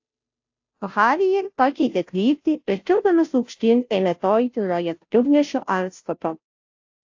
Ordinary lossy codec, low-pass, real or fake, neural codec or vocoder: AAC, 48 kbps; 7.2 kHz; fake; codec, 16 kHz, 0.5 kbps, FunCodec, trained on Chinese and English, 25 frames a second